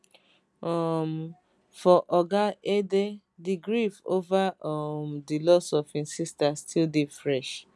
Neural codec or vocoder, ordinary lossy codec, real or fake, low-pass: none; none; real; none